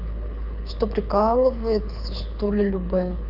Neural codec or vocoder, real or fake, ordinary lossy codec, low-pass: codec, 24 kHz, 6 kbps, HILCodec; fake; none; 5.4 kHz